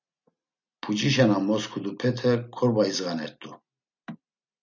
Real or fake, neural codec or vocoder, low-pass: real; none; 7.2 kHz